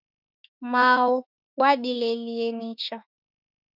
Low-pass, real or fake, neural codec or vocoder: 5.4 kHz; fake; autoencoder, 48 kHz, 32 numbers a frame, DAC-VAE, trained on Japanese speech